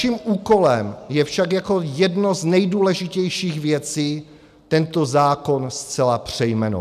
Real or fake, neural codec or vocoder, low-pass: real; none; 14.4 kHz